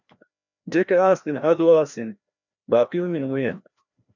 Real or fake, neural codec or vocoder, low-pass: fake; codec, 16 kHz, 1 kbps, FreqCodec, larger model; 7.2 kHz